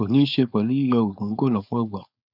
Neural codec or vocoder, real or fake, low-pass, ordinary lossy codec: codec, 16 kHz, 4.8 kbps, FACodec; fake; 5.4 kHz; none